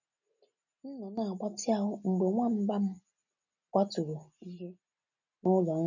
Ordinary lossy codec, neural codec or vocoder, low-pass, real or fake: none; none; 7.2 kHz; real